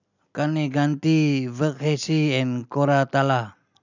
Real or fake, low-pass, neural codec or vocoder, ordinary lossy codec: real; 7.2 kHz; none; none